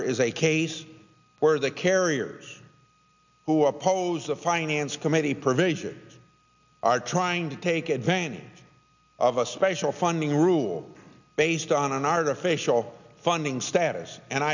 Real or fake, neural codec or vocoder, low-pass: real; none; 7.2 kHz